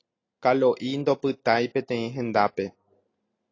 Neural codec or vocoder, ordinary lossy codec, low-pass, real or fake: none; AAC, 32 kbps; 7.2 kHz; real